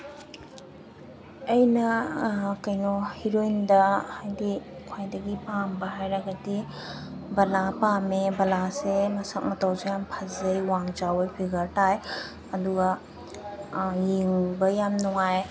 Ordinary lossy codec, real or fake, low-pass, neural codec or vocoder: none; real; none; none